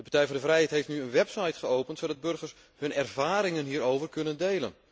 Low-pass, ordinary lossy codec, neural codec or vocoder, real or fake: none; none; none; real